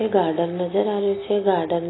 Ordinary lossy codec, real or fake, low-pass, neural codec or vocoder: AAC, 16 kbps; real; 7.2 kHz; none